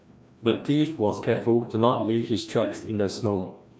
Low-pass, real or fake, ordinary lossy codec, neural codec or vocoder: none; fake; none; codec, 16 kHz, 1 kbps, FreqCodec, larger model